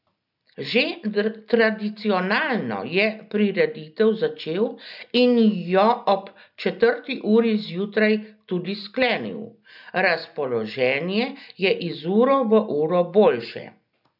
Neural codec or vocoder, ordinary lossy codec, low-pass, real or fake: none; none; 5.4 kHz; real